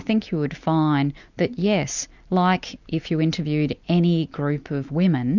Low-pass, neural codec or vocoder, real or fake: 7.2 kHz; none; real